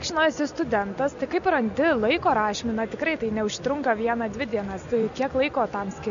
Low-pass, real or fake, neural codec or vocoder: 7.2 kHz; real; none